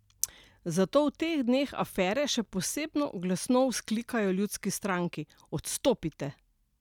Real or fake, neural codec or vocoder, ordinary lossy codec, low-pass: real; none; none; 19.8 kHz